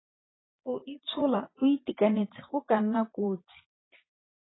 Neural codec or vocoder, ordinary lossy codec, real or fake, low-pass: vocoder, 22.05 kHz, 80 mel bands, Vocos; AAC, 16 kbps; fake; 7.2 kHz